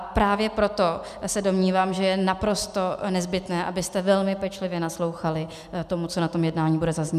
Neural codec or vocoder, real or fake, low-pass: none; real; 14.4 kHz